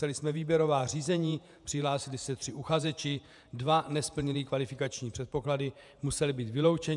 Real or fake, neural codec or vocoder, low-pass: fake; vocoder, 48 kHz, 128 mel bands, Vocos; 10.8 kHz